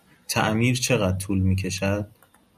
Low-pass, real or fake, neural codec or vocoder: 14.4 kHz; real; none